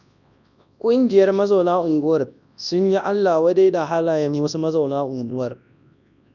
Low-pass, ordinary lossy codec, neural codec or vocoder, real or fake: 7.2 kHz; none; codec, 24 kHz, 0.9 kbps, WavTokenizer, large speech release; fake